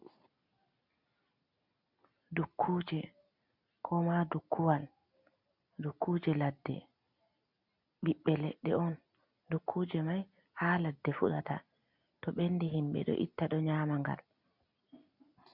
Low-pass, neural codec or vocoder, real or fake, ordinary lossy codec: 5.4 kHz; none; real; MP3, 48 kbps